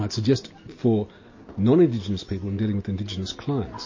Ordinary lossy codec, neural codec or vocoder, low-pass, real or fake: MP3, 32 kbps; none; 7.2 kHz; real